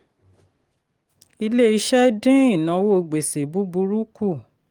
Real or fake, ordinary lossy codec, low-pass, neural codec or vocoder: fake; Opus, 24 kbps; 19.8 kHz; codec, 44.1 kHz, 7.8 kbps, DAC